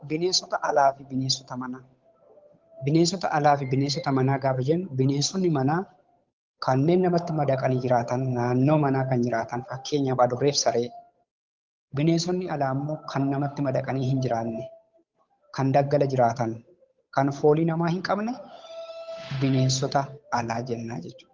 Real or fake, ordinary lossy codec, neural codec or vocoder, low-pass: fake; Opus, 32 kbps; codec, 16 kHz, 8 kbps, FunCodec, trained on Chinese and English, 25 frames a second; 7.2 kHz